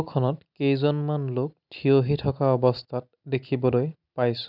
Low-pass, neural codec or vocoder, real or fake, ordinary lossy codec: 5.4 kHz; none; real; none